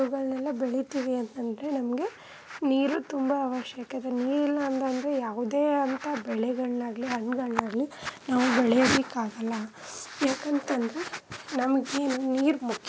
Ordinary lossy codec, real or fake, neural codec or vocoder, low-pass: none; real; none; none